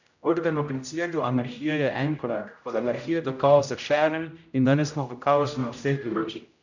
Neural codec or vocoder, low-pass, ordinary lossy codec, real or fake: codec, 16 kHz, 0.5 kbps, X-Codec, HuBERT features, trained on general audio; 7.2 kHz; none; fake